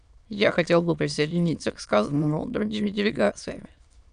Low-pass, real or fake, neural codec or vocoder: 9.9 kHz; fake; autoencoder, 22.05 kHz, a latent of 192 numbers a frame, VITS, trained on many speakers